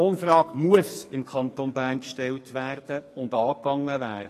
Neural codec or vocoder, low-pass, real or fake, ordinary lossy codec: codec, 44.1 kHz, 2.6 kbps, SNAC; 14.4 kHz; fake; AAC, 48 kbps